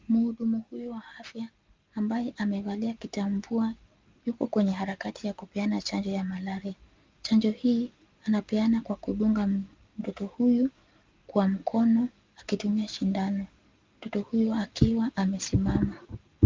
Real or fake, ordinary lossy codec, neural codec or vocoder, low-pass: real; Opus, 32 kbps; none; 7.2 kHz